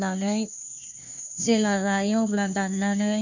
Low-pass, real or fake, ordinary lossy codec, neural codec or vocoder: 7.2 kHz; fake; none; codec, 16 kHz, 1 kbps, FunCodec, trained on Chinese and English, 50 frames a second